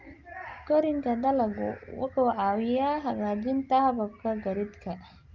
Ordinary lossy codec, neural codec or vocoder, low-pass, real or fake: Opus, 32 kbps; none; 7.2 kHz; real